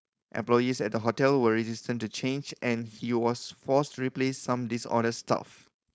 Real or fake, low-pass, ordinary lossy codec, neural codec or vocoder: fake; none; none; codec, 16 kHz, 4.8 kbps, FACodec